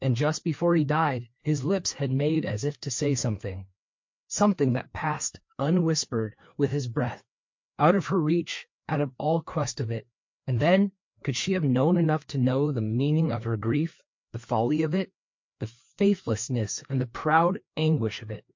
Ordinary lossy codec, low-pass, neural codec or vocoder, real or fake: MP3, 48 kbps; 7.2 kHz; codec, 16 kHz, 2 kbps, FreqCodec, larger model; fake